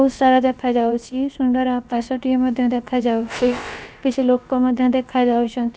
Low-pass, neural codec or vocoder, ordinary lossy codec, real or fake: none; codec, 16 kHz, about 1 kbps, DyCAST, with the encoder's durations; none; fake